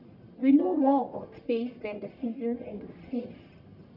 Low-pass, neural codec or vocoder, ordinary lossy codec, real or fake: 5.4 kHz; codec, 44.1 kHz, 1.7 kbps, Pupu-Codec; none; fake